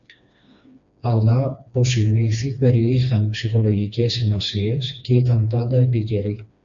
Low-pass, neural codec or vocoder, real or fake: 7.2 kHz; codec, 16 kHz, 2 kbps, FreqCodec, smaller model; fake